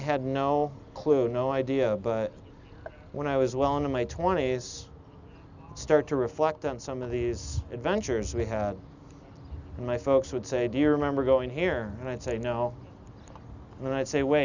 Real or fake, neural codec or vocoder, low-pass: real; none; 7.2 kHz